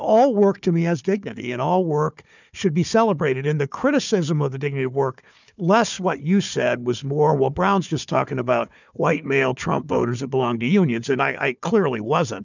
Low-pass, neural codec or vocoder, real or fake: 7.2 kHz; codec, 16 kHz, 4 kbps, FunCodec, trained on Chinese and English, 50 frames a second; fake